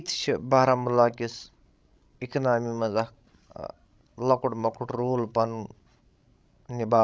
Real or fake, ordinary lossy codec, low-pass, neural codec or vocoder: fake; none; none; codec, 16 kHz, 16 kbps, FreqCodec, larger model